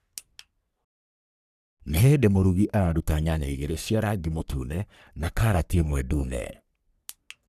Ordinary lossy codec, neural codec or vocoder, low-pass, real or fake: none; codec, 44.1 kHz, 3.4 kbps, Pupu-Codec; 14.4 kHz; fake